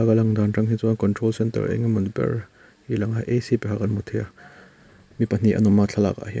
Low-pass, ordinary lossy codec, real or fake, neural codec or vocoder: none; none; real; none